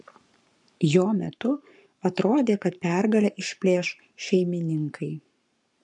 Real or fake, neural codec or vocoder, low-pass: fake; codec, 44.1 kHz, 7.8 kbps, Pupu-Codec; 10.8 kHz